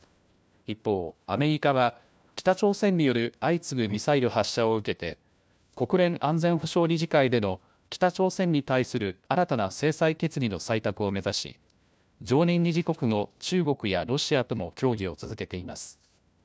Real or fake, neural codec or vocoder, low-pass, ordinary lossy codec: fake; codec, 16 kHz, 1 kbps, FunCodec, trained on LibriTTS, 50 frames a second; none; none